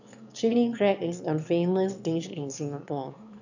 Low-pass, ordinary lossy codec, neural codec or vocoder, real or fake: 7.2 kHz; none; autoencoder, 22.05 kHz, a latent of 192 numbers a frame, VITS, trained on one speaker; fake